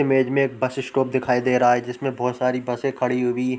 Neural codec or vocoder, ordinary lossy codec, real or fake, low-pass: none; none; real; none